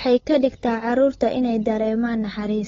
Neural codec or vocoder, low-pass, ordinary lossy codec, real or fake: codec, 16 kHz, 8 kbps, FunCodec, trained on LibriTTS, 25 frames a second; 7.2 kHz; AAC, 24 kbps; fake